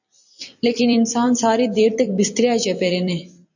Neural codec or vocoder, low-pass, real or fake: vocoder, 44.1 kHz, 128 mel bands every 256 samples, BigVGAN v2; 7.2 kHz; fake